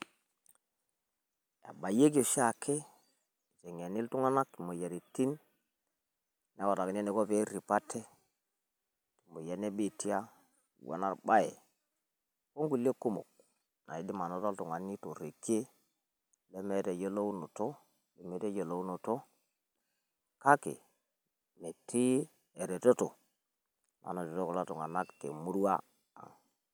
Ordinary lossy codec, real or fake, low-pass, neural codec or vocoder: none; real; none; none